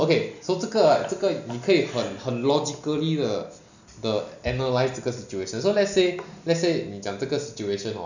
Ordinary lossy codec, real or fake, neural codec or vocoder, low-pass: none; real; none; 7.2 kHz